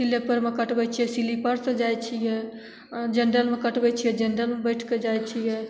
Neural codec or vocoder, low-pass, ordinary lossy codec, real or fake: none; none; none; real